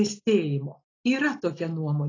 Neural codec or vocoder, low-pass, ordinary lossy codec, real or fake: none; 7.2 kHz; AAC, 32 kbps; real